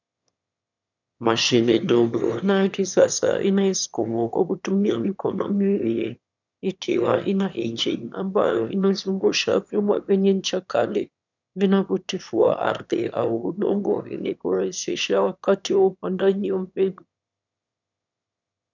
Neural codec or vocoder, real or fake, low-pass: autoencoder, 22.05 kHz, a latent of 192 numbers a frame, VITS, trained on one speaker; fake; 7.2 kHz